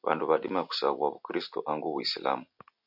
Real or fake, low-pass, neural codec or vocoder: real; 5.4 kHz; none